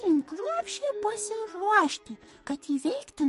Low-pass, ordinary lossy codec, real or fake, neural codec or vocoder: 14.4 kHz; MP3, 48 kbps; fake; codec, 44.1 kHz, 2.6 kbps, SNAC